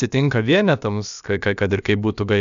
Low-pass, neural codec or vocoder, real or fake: 7.2 kHz; codec, 16 kHz, about 1 kbps, DyCAST, with the encoder's durations; fake